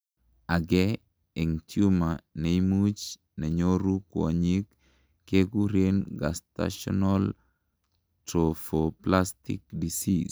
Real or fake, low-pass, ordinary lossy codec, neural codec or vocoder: real; none; none; none